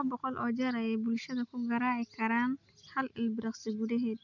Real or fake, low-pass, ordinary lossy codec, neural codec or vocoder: real; 7.2 kHz; none; none